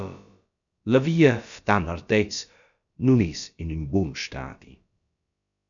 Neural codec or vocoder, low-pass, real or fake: codec, 16 kHz, about 1 kbps, DyCAST, with the encoder's durations; 7.2 kHz; fake